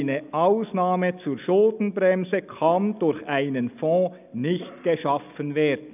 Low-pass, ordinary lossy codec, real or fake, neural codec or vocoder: 3.6 kHz; none; real; none